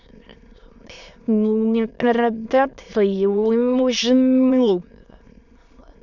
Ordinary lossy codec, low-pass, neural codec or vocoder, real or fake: none; 7.2 kHz; autoencoder, 22.05 kHz, a latent of 192 numbers a frame, VITS, trained on many speakers; fake